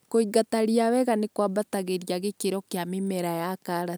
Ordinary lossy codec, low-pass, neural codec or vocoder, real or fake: none; none; none; real